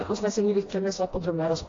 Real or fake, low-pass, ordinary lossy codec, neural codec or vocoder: fake; 7.2 kHz; AAC, 32 kbps; codec, 16 kHz, 1 kbps, FreqCodec, smaller model